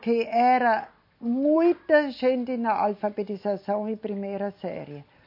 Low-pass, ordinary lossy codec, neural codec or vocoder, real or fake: 5.4 kHz; MP3, 32 kbps; none; real